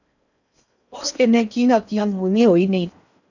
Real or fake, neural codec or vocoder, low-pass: fake; codec, 16 kHz in and 24 kHz out, 0.6 kbps, FocalCodec, streaming, 4096 codes; 7.2 kHz